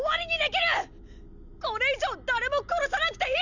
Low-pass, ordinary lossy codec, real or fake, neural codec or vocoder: 7.2 kHz; none; real; none